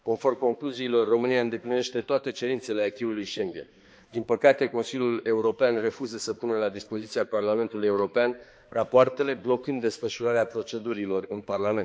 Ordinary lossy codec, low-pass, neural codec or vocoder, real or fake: none; none; codec, 16 kHz, 2 kbps, X-Codec, HuBERT features, trained on balanced general audio; fake